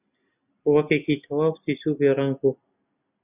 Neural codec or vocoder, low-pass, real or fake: none; 3.6 kHz; real